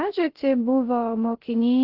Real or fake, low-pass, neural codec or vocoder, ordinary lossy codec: fake; 5.4 kHz; codec, 16 kHz, 0.3 kbps, FocalCodec; Opus, 16 kbps